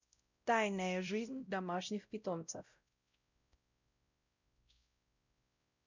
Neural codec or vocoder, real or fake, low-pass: codec, 16 kHz, 0.5 kbps, X-Codec, WavLM features, trained on Multilingual LibriSpeech; fake; 7.2 kHz